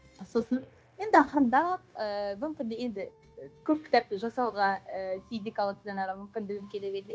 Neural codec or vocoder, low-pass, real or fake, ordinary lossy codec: codec, 16 kHz, 0.9 kbps, LongCat-Audio-Codec; none; fake; none